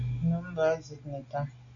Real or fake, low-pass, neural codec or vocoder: real; 7.2 kHz; none